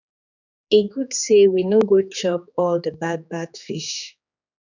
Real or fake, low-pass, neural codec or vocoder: fake; 7.2 kHz; codec, 16 kHz, 4 kbps, X-Codec, HuBERT features, trained on general audio